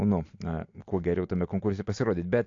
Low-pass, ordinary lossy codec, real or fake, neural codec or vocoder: 7.2 kHz; AAC, 48 kbps; real; none